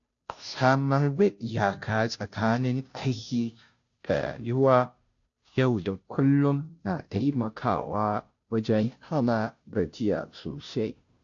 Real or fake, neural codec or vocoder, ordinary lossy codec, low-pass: fake; codec, 16 kHz, 0.5 kbps, FunCodec, trained on Chinese and English, 25 frames a second; AAC, 48 kbps; 7.2 kHz